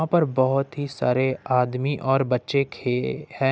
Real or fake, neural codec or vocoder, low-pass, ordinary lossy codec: real; none; none; none